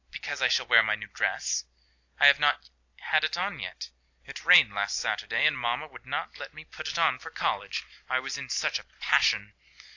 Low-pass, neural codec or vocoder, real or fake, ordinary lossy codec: 7.2 kHz; none; real; AAC, 48 kbps